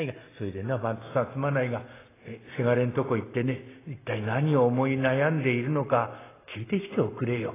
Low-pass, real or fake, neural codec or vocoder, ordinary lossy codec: 3.6 kHz; real; none; AAC, 16 kbps